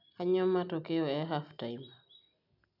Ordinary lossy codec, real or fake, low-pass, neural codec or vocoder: none; real; 5.4 kHz; none